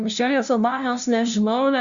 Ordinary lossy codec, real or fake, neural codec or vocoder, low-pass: Opus, 64 kbps; fake; codec, 16 kHz, 0.5 kbps, FunCodec, trained on LibriTTS, 25 frames a second; 7.2 kHz